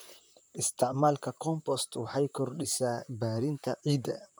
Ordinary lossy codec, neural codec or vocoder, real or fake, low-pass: none; vocoder, 44.1 kHz, 128 mel bands, Pupu-Vocoder; fake; none